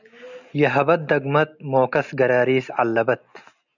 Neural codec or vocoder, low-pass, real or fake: none; 7.2 kHz; real